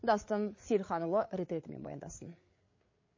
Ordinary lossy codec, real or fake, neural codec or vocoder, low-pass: MP3, 32 kbps; fake; codec, 16 kHz, 8 kbps, FreqCodec, larger model; 7.2 kHz